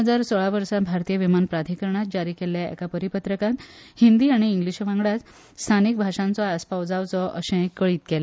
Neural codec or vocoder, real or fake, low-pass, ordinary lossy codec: none; real; none; none